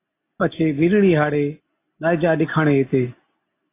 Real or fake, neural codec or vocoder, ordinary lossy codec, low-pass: real; none; AAC, 24 kbps; 3.6 kHz